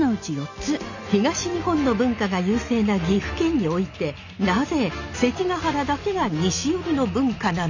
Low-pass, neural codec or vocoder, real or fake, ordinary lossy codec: 7.2 kHz; none; real; none